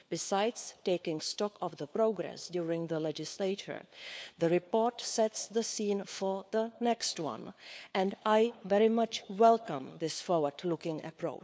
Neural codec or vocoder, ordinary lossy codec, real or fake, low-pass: codec, 16 kHz, 4 kbps, FunCodec, trained on LibriTTS, 50 frames a second; none; fake; none